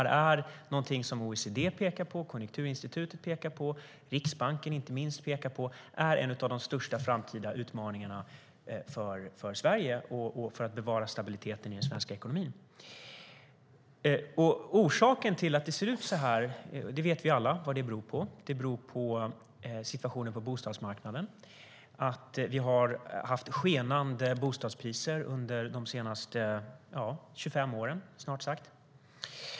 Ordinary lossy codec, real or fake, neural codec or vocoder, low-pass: none; real; none; none